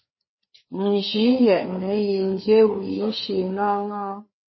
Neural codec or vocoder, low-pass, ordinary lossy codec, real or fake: codec, 16 kHz, 4 kbps, FreqCodec, larger model; 7.2 kHz; MP3, 24 kbps; fake